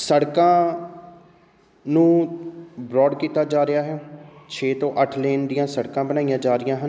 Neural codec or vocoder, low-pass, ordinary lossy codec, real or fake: none; none; none; real